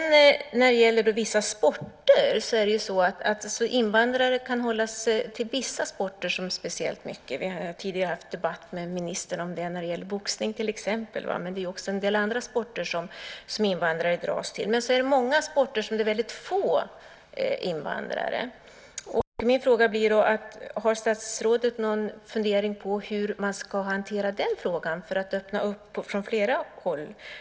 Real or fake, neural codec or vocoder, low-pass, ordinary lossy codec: real; none; none; none